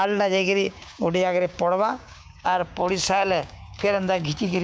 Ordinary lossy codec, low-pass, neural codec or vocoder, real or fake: none; none; codec, 16 kHz, 6 kbps, DAC; fake